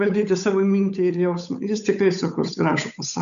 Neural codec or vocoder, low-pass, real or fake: codec, 16 kHz, 8 kbps, FunCodec, trained on Chinese and English, 25 frames a second; 7.2 kHz; fake